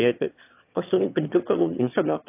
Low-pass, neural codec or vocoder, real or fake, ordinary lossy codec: 3.6 kHz; autoencoder, 22.05 kHz, a latent of 192 numbers a frame, VITS, trained on one speaker; fake; AAC, 24 kbps